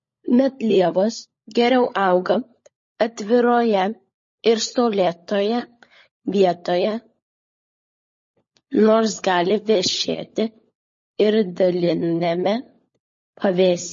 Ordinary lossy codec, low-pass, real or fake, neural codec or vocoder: MP3, 32 kbps; 7.2 kHz; fake; codec, 16 kHz, 16 kbps, FunCodec, trained on LibriTTS, 50 frames a second